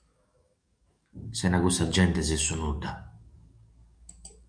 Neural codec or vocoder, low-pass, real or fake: codec, 44.1 kHz, 7.8 kbps, DAC; 9.9 kHz; fake